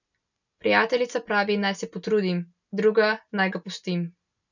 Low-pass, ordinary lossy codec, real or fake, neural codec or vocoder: 7.2 kHz; none; real; none